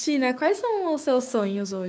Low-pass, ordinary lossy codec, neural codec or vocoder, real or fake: none; none; codec, 16 kHz, 6 kbps, DAC; fake